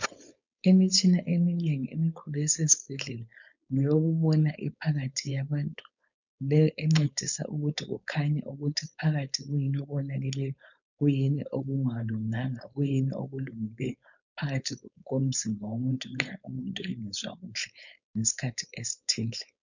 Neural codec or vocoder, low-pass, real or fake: codec, 16 kHz, 4.8 kbps, FACodec; 7.2 kHz; fake